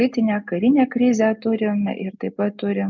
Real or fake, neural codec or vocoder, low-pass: real; none; 7.2 kHz